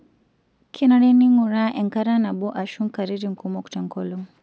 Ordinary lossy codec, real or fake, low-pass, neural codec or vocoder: none; real; none; none